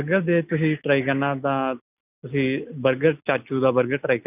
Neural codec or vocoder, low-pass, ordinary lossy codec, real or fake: none; 3.6 kHz; none; real